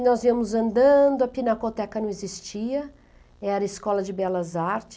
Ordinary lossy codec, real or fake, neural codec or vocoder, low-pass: none; real; none; none